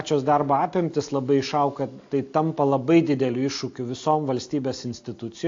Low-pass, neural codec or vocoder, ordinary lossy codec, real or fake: 7.2 kHz; none; AAC, 48 kbps; real